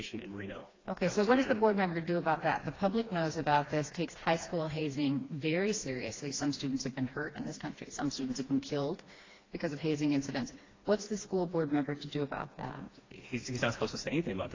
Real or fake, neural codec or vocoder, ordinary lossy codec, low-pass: fake; codec, 16 kHz, 2 kbps, FreqCodec, smaller model; AAC, 32 kbps; 7.2 kHz